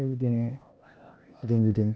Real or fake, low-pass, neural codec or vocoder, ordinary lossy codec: fake; none; codec, 16 kHz, 0.8 kbps, ZipCodec; none